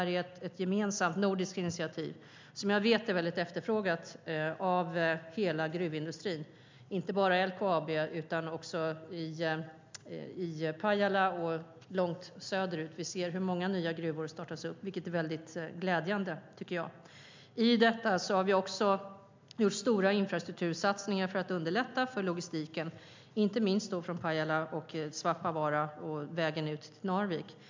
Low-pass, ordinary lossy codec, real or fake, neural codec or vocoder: 7.2 kHz; MP3, 64 kbps; real; none